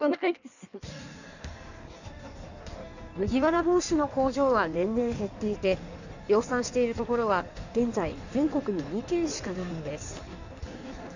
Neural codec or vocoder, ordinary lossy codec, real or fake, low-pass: codec, 16 kHz in and 24 kHz out, 1.1 kbps, FireRedTTS-2 codec; none; fake; 7.2 kHz